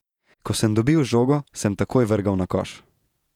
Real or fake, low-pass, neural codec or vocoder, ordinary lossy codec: fake; 19.8 kHz; vocoder, 48 kHz, 128 mel bands, Vocos; none